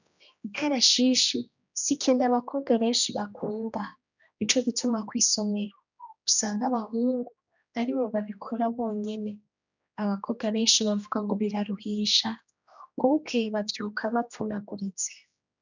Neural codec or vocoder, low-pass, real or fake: codec, 16 kHz, 1 kbps, X-Codec, HuBERT features, trained on general audio; 7.2 kHz; fake